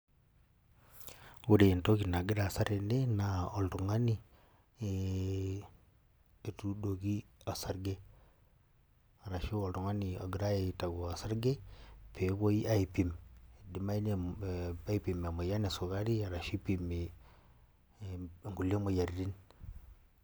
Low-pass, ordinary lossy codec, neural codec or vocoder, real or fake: none; none; none; real